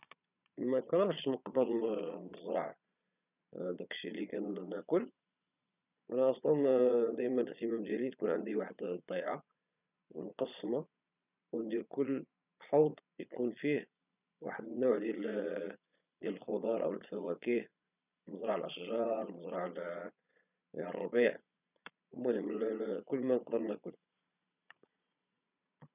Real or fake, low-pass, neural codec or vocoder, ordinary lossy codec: fake; 3.6 kHz; vocoder, 22.05 kHz, 80 mel bands, Vocos; none